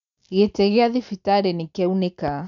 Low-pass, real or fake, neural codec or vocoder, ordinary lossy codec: 7.2 kHz; real; none; none